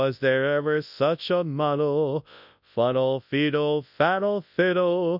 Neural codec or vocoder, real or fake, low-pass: codec, 24 kHz, 0.9 kbps, WavTokenizer, large speech release; fake; 5.4 kHz